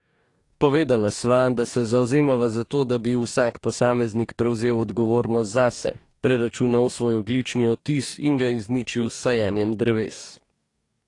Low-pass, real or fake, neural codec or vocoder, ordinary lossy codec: 10.8 kHz; fake; codec, 44.1 kHz, 2.6 kbps, DAC; AAC, 48 kbps